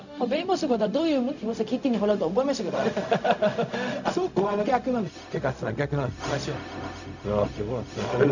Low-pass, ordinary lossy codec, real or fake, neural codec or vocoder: 7.2 kHz; none; fake; codec, 16 kHz, 0.4 kbps, LongCat-Audio-Codec